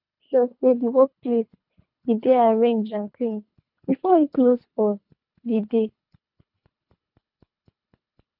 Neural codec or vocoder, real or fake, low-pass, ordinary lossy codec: codec, 24 kHz, 3 kbps, HILCodec; fake; 5.4 kHz; none